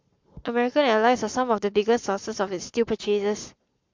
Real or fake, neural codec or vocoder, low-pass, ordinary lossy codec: fake; codec, 44.1 kHz, 7.8 kbps, Pupu-Codec; 7.2 kHz; MP3, 64 kbps